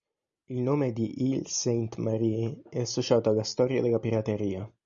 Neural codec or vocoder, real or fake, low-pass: none; real; 7.2 kHz